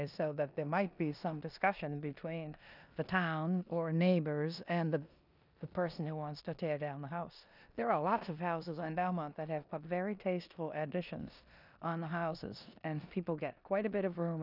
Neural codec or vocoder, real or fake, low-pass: codec, 16 kHz in and 24 kHz out, 0.9 kbps, LongCat-Audio-Codec, four codebook decoder; fake; 5.4 kHz